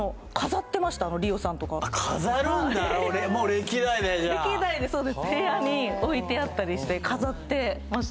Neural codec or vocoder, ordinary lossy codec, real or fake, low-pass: none; none; real; none